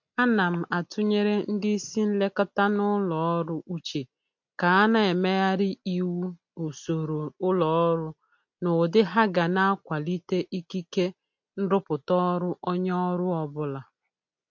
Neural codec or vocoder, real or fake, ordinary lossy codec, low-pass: none; real; MP3, 48 kbps; 7.2 kHz